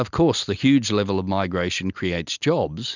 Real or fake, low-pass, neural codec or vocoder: real; 7.2 kHz; none